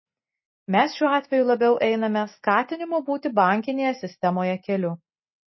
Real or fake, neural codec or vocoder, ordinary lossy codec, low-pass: real; none; MP3, 24 kbps; 7.2 kHz